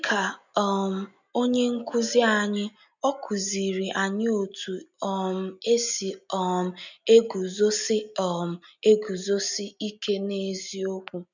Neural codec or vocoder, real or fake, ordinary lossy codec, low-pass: none; real; none; 7.2 kHz